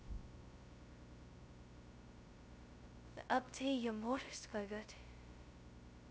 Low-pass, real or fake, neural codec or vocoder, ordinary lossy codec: none; fake; codec, 16 kHz, 0.2 kbps, FocalCodec; none